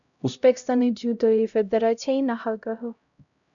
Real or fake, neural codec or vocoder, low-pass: fake; codec, 16 kHz, 0.5 kbps, X-Codec, HuBERT features, trained on LibriSpeech; 7.2 kHz